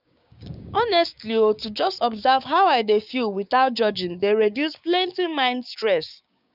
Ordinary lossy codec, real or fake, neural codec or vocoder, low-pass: none; fake; codec, 16 kHz, 6 kbps, DAC; 5.4 kHz